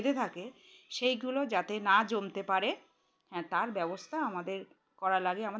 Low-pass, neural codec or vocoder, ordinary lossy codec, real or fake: none; none; none; real